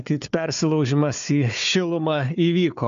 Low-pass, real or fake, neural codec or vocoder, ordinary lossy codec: 7.2 kHz; fake; codec, 16 kHz, 4 kbps, FunCodec, trained on Chinese and English, 50 frames a second; MP3, 64 kbps